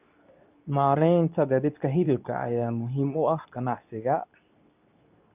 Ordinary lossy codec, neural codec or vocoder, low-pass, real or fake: none; codec, 24 kHz, 0.9 kbps, WavTokenizer, medium speech release version 2; 3.6 kHz; fake